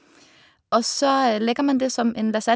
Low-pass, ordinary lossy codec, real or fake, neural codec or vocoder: none; none; real; none